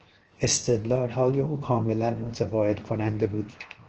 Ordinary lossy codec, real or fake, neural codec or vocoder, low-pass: Opus, 16 kbps; fake; codec, 16 kHz, 0.7 kbps, FocalCodec; 7.2 kHz